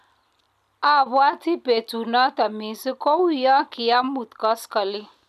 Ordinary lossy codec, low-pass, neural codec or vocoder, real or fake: none; 14.4 kHz; none; real